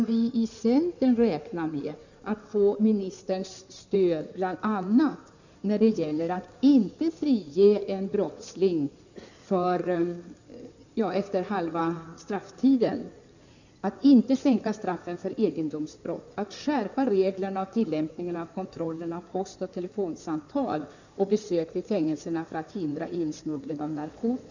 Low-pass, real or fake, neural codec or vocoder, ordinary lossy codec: 7.2 kHz; fake; codec, 16 kHz in and 24 kHz out, 2.2 kbps, FireRedTTS-2 codec; none